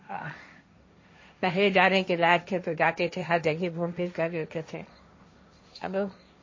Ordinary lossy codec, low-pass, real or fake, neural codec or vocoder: MP3, 32 kbps; 7.2 kHz; fake; codec, 16 kHz, 1.1 kbps, Voila-Tokenizer